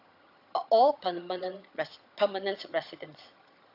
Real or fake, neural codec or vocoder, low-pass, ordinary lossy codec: fake; vocoder, 22.05 kHz, 80 mel bands, HiFi-GAN; 5.4 kHz; AAC, 32 kbps